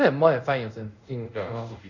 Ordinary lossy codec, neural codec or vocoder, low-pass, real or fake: none; codec, 24 kHz, 0.5 kbps, DualCodec; 7.2 kHz; fake